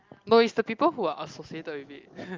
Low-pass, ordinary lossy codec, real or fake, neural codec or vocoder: 7.2 kHz; Opus, 32 kbps; real; none